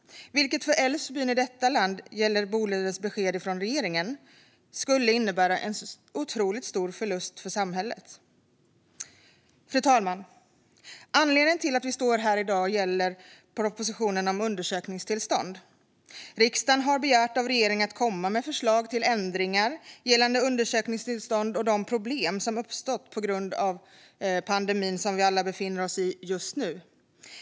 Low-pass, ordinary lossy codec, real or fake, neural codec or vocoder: none; none; real; none